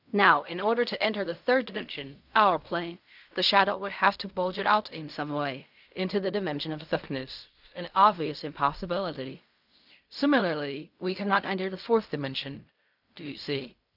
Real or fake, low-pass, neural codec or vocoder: fake; 5.4 kHz; codec, 16 kHz in and 24 kHz out, 0.4 kbps, LongCat-Audio-Codec, fine tuned four codebook decoder